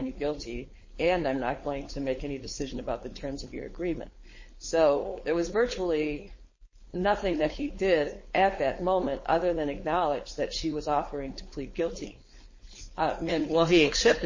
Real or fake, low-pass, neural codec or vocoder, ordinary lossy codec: fake; 7.2 kHz; codec, 16 kHz, 4.8 kbps, FACodec; MP3, 32 kbps